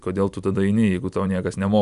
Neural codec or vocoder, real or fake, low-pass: none; real; 10.8 kHz